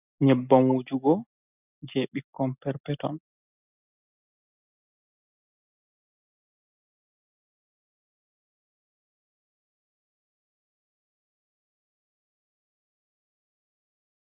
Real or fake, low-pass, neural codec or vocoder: real; 3.6 kHz; none